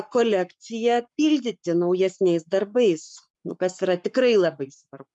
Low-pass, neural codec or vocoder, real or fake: 10.8 kHz; codec, 44.1 kHz, 7.8 kbps, Pupu-Codec; fake